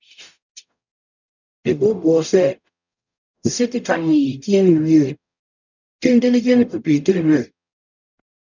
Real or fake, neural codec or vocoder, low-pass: fake; codec, 44.1 kHz, 0.9 kbps, DAC; 7.2 kHz